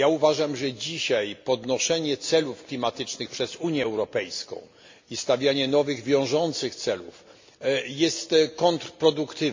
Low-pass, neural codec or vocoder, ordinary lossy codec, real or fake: 7.2 kHz; none; MP3, 48 kbps; real